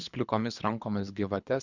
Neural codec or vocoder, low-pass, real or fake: codec, 24 kHz, 3 kbps, HILCodec; 7.2 kHz; fake